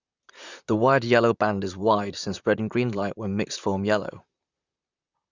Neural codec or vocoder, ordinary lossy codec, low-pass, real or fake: vocoder, 44.1 kHz, 128 mel bands, Pupu-Vocoder; Opus, 64 kbps; 7.2 kHz; fake